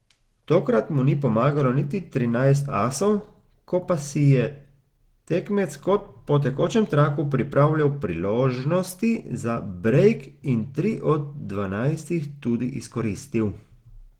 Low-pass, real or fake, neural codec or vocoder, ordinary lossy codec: 19.8 kHz; real; none; Opus, 16 kbps